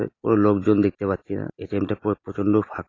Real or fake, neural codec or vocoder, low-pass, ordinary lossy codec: real; none; 7.2 kHz; AAC, 32 kbps